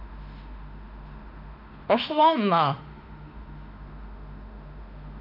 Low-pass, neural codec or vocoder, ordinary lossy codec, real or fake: 5.4 kHz; autoencoder, 48 kHz, 32 numbers a frame, DAC-VAE, trained on Japanese speech; none; fake